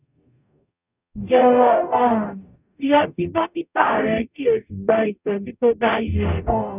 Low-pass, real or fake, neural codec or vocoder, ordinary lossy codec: 3.6 kHz; fake; codec, 44.1 kHz, 0.9 kbps, DAC; none